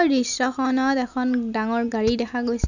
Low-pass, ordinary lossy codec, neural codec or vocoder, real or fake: 7.2 kHz; none; none; real